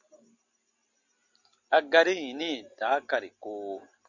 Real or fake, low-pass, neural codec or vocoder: real; 7.2 kHz; none